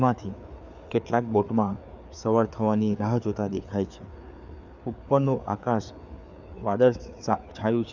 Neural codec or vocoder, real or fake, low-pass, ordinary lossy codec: codec, 16 kHz, 4 kbps, FreqCodec, larger model; fake; 7.2 kHz; none